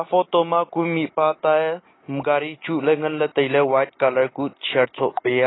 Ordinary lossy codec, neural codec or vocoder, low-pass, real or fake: AAC, 16 kbps; none; 7.2 kHz; real